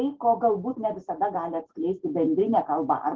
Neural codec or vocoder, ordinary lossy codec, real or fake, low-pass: none; Opus, 16 kbps; real; 7.2 kHz